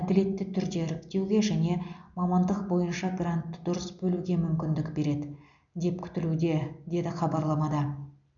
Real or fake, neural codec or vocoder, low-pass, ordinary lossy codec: real; none; 7.2 kHz; none